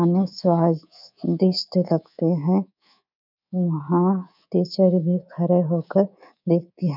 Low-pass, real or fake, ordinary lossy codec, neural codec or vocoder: 5.4 kHz; fake; none; codec, 24 kHz, 3.1 kbps, DualCodec